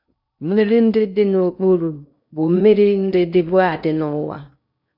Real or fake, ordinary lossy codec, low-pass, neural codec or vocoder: fake; none; 5.4 kHz; codec, 16 kHz in and 24 kHz out, 0.6 kbps, FocalCodec, streaming, 2048 codes